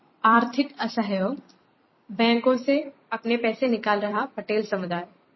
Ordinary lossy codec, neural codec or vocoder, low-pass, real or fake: MP3, 24 kbps; vocoder, 44.1 kHz, 128 mel bands every 256 samples, BigVGAN v2; 7.2 kHz; fake